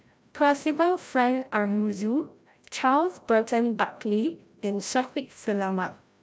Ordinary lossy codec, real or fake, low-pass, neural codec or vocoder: none; fake; none; codec, 16 kHz, 0.5 kbps, FreqCodec, larger model